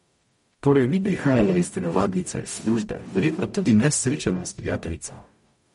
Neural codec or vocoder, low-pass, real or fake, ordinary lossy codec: codec, 44.1 kHz, 0.9 kbps, DAC; 19.8 kHz; fake; MP3, 48 kbps